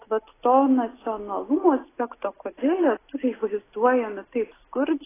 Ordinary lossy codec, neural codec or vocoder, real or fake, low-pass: AAC, 16 kbps; none; real; 3.6 kHz